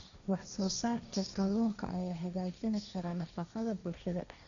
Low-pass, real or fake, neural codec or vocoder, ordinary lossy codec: 7.2 kHz; fake; codec, 16 kHz, 1.1 kbps, Voila-Tokenizer; none